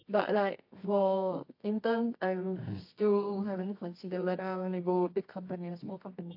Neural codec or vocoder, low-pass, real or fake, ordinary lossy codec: codec, 24 kHz, 0.9 kbps, WavTokenizer, medium music audio release; 5.4 kHz; fake; AAC, 32 kbps